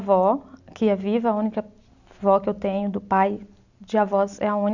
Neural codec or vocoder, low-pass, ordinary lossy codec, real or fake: none; 7.2 kHz; none; real